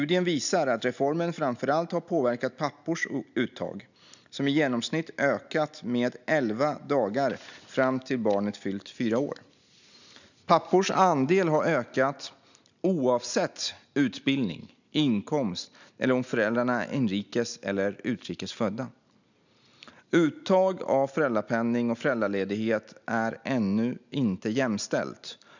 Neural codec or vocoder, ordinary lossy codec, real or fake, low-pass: none; none; real; 7.2 kHz